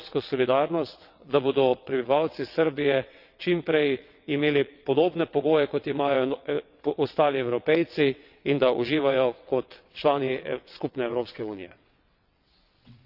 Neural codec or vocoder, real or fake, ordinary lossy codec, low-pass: vocoder, 22.05 kHz, 80 mel bands, WaveNeXt; fake; none; 5.4 kHz